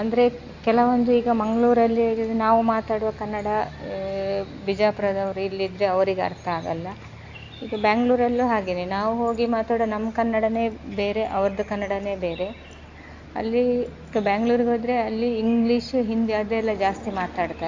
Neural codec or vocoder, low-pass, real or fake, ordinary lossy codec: none; 7.2 kHz; real; AAC, 48 kbps